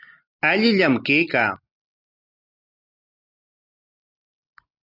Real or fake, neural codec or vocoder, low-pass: real; none; 5.4 kHz